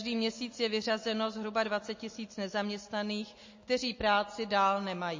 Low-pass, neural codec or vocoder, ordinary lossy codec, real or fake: 7.2 kHz; none; MP3, 32 kbps; real